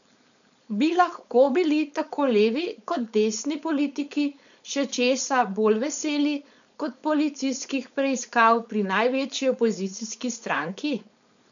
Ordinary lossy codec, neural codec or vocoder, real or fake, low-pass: none; codec, 16 kHz, 4.8 kbps, FACodec; fake; 7.2 kHz